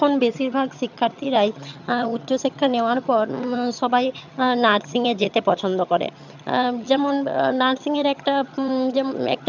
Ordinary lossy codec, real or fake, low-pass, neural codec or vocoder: none; fake; 7.2 kHz; vocoder, 22.05 kHz, 80 mel bands, HiFi-GAN